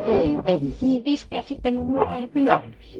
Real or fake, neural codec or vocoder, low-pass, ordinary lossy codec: fake; codec, 44.1 kHz, 0.9 kbps, DAC; 19.8 kHz; Opus, 24 kbps